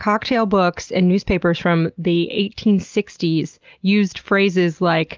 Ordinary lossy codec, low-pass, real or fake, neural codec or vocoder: Opus, 32 kbps; 7.2 kHz; real; none